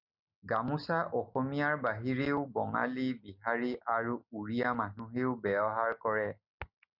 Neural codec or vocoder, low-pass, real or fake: none; 5.4 kHz; real